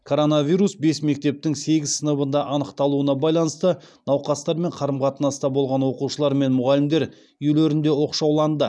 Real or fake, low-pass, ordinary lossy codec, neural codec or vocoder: real; none; none; none